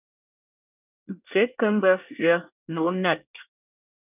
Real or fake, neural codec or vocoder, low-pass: fake; codec, 24 kHz, 1 kbps, SNAC; 3.6 kHz